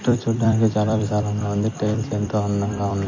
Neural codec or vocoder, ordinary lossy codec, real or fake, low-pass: vocoder, 44.1 kHz, 128 mel bands every 256 samples, BigVGAN v2; MP3, 32 kbps; fake; 7.2 kHz